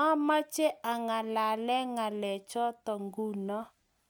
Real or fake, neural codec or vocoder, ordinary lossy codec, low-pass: real; none; none; none